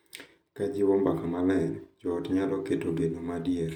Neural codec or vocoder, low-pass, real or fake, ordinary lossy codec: none; 19.8 kHz; real; none